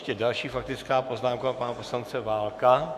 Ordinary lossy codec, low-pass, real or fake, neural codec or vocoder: Opus, 64 kbps; 14.4 kHz; fake; autoencoder, 48 kHz, 128 numbers a frame, DAC-VAE, trained on Japanese speech